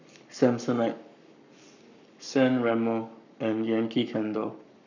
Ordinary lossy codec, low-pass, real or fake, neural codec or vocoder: none; 7.2 kHz; fake; codec, 44.1 kHz, 7.8 kbps, Pupu-Codec